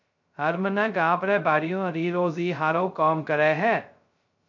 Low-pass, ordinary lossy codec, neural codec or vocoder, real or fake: 7.2 kHz; MP3, 48 kbps; codec, 16 kHz, 0.2 kbps, FocalCodec; fake